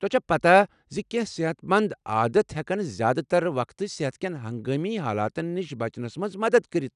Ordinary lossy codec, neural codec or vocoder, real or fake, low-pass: none; none; real; 10.8 kHz